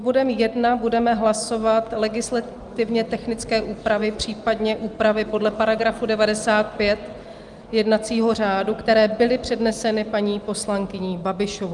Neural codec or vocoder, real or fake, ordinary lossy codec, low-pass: none; real; Opus, 32 kbps; 10.8 kHz